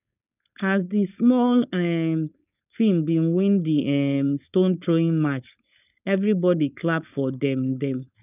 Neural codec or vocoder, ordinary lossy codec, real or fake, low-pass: codec, 16 kHz, 4.8 kbps, FACodec; none; fake; 3.6 kHz